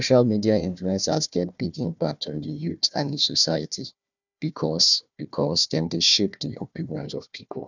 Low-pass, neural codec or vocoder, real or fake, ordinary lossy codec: 7.2 kHz; codec, 16 kHz, 1 kbps, FunCodec, trained on Chinese and English, 50 frames a second; fake; none